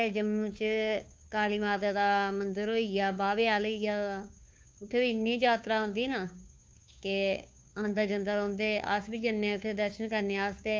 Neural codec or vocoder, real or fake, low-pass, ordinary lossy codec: codec, 16 kHz, 2 kbps, FunCodec, trained on Chinese and English, 25 frames a second; fake; none; none